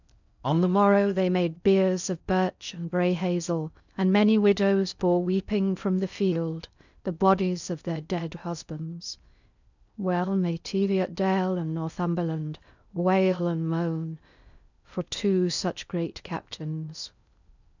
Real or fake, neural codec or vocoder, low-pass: fake; codec, 16 kHz in and 24 kHz out, 0.6 kbps, FocalCodec, streaming, 4096 codes; 7.2 kHz